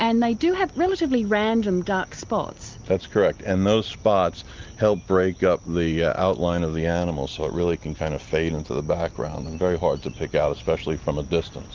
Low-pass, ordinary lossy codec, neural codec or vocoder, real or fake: 7.2 kHz; Opus, 24 kbps; none; real